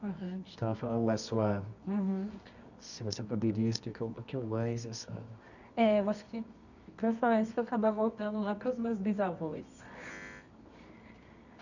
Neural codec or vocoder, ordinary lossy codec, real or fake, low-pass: codec, 24 kHz, 0.9 kbps, WavTokenizer, medium music audio release; none; fake; 7.2 kHz